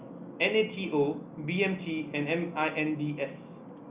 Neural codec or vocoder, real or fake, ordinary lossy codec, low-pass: none; real; Opus, 64 kbps; 3.6 kHz